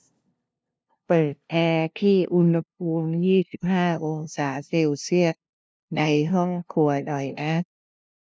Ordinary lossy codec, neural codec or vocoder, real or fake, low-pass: none; codec, 16 kHz, 0.5 kbps, FunCodec, trained on LibriTTS, 25 frames a second; fake; none